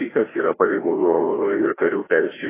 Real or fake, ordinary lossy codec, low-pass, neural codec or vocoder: fake; AAC, 16 kbps; 3.6 kHz; codec, 16 kHz, 1 kbps, FreqCodec, larger model